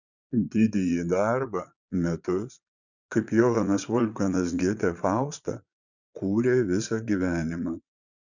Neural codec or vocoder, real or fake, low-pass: vocoder, 22.05 kHz, 80 mel bands, Vocos; fake; 7.2 kHz